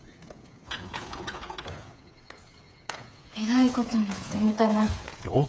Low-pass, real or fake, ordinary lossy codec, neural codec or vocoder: none; fake; none; codec, 16 kHz, 8 kbps, FreqCodec, smaller model